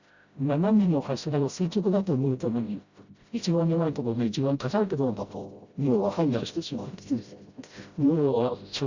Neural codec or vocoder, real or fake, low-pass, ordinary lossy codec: codec, 16 kHz, 0.5 kbps, FreqCodec, smaller model; fake; 7.2 kHz; Opus, 64 kbps